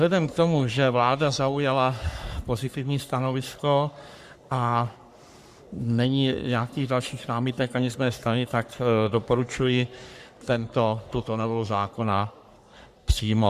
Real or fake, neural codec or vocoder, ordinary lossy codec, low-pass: fake; codec, 44.1 kHz, 3.4 kbps, Pupu-Codec; Opus, 64 kbps; 14.4 kHz